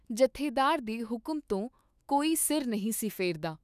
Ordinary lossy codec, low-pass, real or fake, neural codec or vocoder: none; 14.4 kHz; fake; autoencoder, 48 kHz, 128 numbers a frame, DAC-VAE, trained on Japanese speech